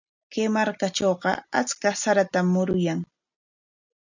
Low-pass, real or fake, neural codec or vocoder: 7.2 kHz; real; none